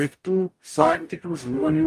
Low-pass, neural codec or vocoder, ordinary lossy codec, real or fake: 14.4 kHz; codec, 44.1 kHz, 0.9 kbps, DAC; Opus, 32 kbps; fake